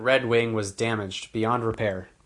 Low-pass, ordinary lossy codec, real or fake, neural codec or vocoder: 10.8 kHz; Opus, 64 kbps; real; none